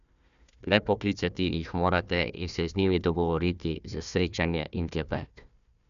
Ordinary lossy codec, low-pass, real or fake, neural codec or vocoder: none; 7.2 kHz; fake; codec, 16 kHz, 1 kbps, FunCodec, trained on Chinese and English, 50 frames a second